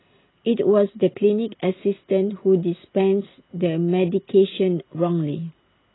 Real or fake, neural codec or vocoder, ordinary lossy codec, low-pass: real; none; AAC, 16 kbps; 7.2 kHz